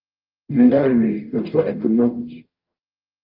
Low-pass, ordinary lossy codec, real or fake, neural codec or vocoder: 5.4 kHz; Opus, 32 kbps; fake; codec, 44.1 kHz, 0.9 kbps, DAC